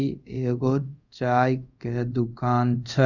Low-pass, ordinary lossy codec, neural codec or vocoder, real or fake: 7.2 kHz; none; codec, 24 kHz, 0.5 kbps, DualCodec; fake